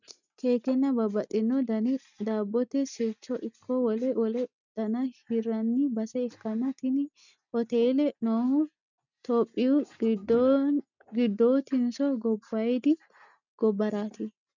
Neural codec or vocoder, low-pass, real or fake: none; 7.2 kHz; real